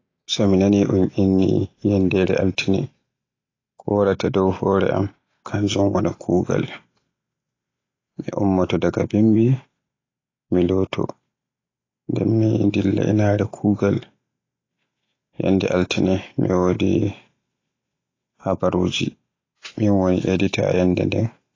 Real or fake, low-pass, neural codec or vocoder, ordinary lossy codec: real; 7.2 kHz; none; AAC, 32 kbps